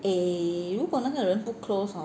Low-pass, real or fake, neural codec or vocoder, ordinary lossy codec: none; real; none; none